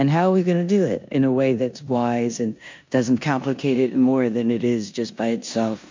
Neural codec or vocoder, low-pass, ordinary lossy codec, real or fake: codec, 16 kHz in and 24 kHz out, 0.9 kbps, LongCat-Audio-Codec, four codebook decoder; 7.2 kHz; MP3, 48 kbps; fake